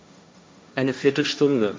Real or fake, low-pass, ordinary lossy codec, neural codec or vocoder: fake; none; none; codec, 16 kHz, 1.1 kbps, Voila-Tokenizer